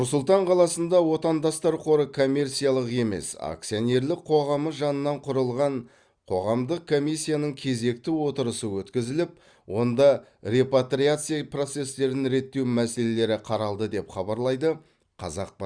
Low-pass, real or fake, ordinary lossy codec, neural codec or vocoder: 9.9 kHz; real; Opus, 64 kbps; none